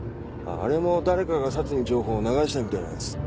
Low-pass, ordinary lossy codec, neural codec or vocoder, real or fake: none; none; none; real